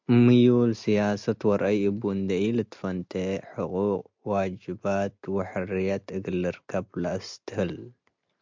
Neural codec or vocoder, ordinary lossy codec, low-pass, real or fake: none; MP3, 64 kbps; 7.2 kHz; real